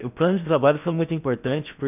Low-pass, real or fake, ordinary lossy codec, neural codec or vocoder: 3.6 kHz; fake; none; codec, 16 kHz in and 24 kHz out, 0.8 kbps, FocalCodec, streaming, 65536 codes